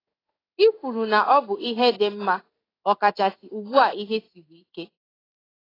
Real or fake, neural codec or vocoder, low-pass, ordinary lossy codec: fake; codec, 16 kHz in and 24 kHz out, 1 kbps, XY-Tokenizer; 5.4 kHz; AAC, 24 kbps